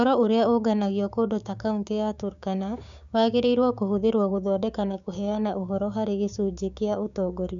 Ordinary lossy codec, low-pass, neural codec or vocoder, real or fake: none; 7.2 kHz; codec, 16 kHz, 6 kbps, DAC; fake